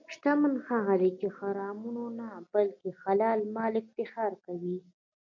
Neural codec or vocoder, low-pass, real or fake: none; 7.2 kHz; real